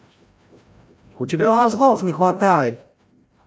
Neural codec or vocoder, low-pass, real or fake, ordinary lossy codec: codec, 16 kHz, 0.5 kbps, FreqCodec, larger model; none; fake; none